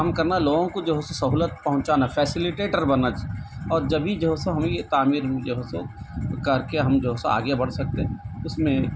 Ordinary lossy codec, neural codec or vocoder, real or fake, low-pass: none; none; real; none